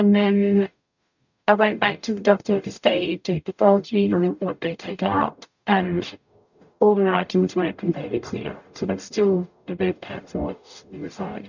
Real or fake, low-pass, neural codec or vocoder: fake; 7.2 kHz; codec, 44.1 kHz, 0.9 kbps, DAC